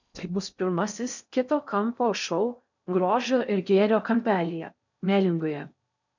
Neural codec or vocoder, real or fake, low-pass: codec, 16 kHz in and 24 kHz out, 0.6 kbps, FocalCodec, streaming, 4096 codes; fake; 7.2 kHz